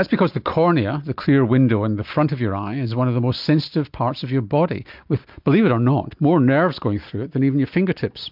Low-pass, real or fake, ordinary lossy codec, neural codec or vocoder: 5.4 kHz; real; MP3, 48 kbps; none